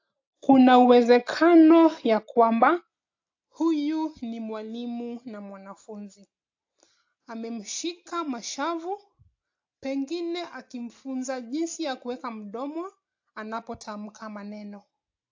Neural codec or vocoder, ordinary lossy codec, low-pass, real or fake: none; AAC, 48 kbps; 7.2 kHz; real